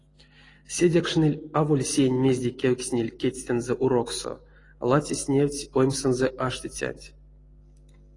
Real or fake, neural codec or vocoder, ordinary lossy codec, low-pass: real; none; AAC, 32 kbps; 10.8 kHz